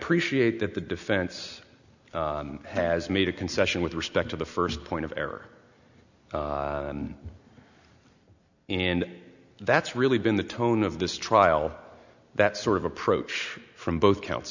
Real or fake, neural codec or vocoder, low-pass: real; none; 7.2 kHz